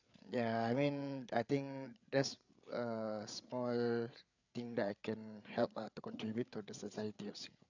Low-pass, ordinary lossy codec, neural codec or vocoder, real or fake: 7.2 kHz; none; codec, 16 kHz, 8 kbps, FreqCodec, larger model; fake